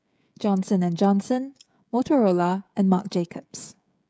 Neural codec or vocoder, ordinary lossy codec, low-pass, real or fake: codec, 16 kHz, 16 kbps, FreqCodec, smaller model; none; none; fake